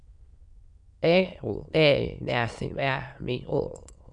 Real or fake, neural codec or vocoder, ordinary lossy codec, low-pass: fake; autoencoder, 22.05 kHz, a latent of 192 numbers a frame, VITS, trained on many speakers; Opus, 64 kbps; 9.9 kHz